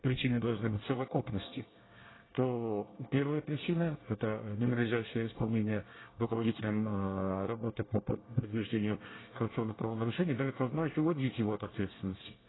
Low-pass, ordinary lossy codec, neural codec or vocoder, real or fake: 7.2 kHz; AAC, 16 kbps; codec, 24 kHz, 1 kbps, SNAC; fake